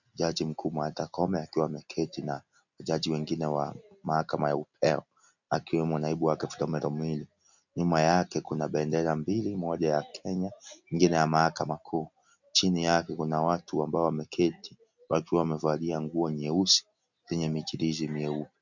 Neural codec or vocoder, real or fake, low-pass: none; real; 7.2 kHz